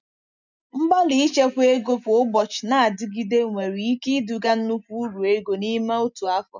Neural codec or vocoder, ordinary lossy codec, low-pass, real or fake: none; none; 7.2 kHz; real